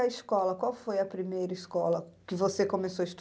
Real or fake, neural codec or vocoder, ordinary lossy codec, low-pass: real; none; none; none